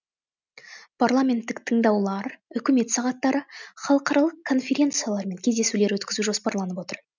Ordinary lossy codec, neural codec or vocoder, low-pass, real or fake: none; none; 7.2 kHz; real